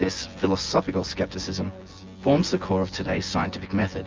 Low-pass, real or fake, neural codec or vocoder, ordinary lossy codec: 7.2 kHz; fake; vocoder, 24 kHz, 100 mel bands, Vocos; Opus, 32 kbps